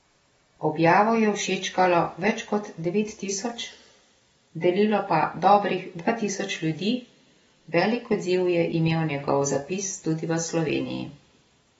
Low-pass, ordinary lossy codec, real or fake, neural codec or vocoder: 19.8 kHz; AAC, 24 kbps; real; none